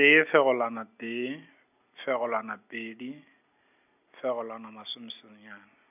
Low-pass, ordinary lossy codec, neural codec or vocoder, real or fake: 3.6 kHz; none; none; real